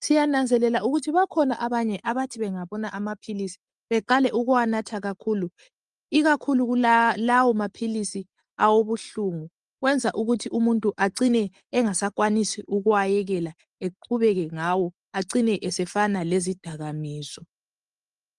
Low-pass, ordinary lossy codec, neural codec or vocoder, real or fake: 10.8 kHz; Opus, 32 kbps; none; real